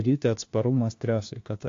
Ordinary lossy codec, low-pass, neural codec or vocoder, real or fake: AAC, 48 kbps; 7.2 kHz; codec, 16 kHz, 1 kbps, FunCodec, trained on LibriTTS, 50 frames a second; fake